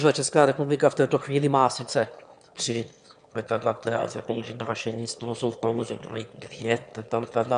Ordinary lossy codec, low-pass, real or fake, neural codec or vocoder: AAC, 96 kbps; 9.9 kHz; fake; autoencoder, 22.05 kHz, a latent of 192 numbers a frame, VITS, trained on one speaker